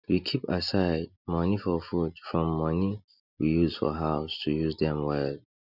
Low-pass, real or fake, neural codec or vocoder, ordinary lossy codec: 5.4 kHz; real; none; none